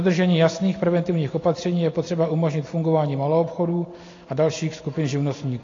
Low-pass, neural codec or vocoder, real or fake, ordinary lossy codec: 7.2 kHz; none; real; AAC, 32 kbps